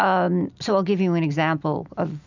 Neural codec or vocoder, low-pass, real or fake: none; 7.2 kHz; real